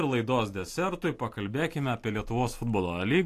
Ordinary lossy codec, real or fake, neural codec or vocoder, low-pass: AAC, 64 kbps; real; none; 14.4 kHz